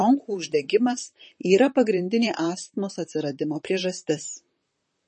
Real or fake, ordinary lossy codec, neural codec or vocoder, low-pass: fake; MP3, 32 kbps; vocoder, 44.1 kHz, 128 mel bands, Pupu-Vocoder; 10.8 kHz